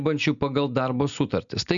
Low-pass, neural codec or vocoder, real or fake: 7.2 kHz; none; real